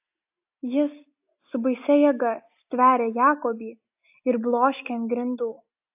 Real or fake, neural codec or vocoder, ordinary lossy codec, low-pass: real; none; AAC, 32 kbps; 3.6 kHz